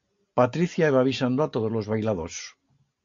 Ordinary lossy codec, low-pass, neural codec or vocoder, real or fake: AAC, 48 kbps; 7.2 kHz; none; real